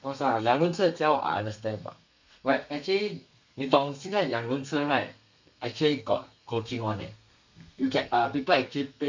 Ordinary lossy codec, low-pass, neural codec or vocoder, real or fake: MP3, 64 kbps; 7.2 kHz; codec, 32 kHz, 1.9 kbps, SNAC; fake